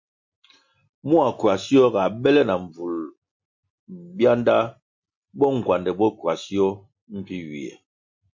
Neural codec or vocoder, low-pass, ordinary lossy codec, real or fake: none; 7.2 kHz; MP3, 48 kbps; real